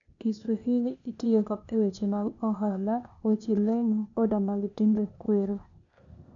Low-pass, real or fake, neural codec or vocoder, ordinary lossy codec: 7.2 kHz; fake; codec, 16 kHz, 0.8 kbps, ZipCodec; none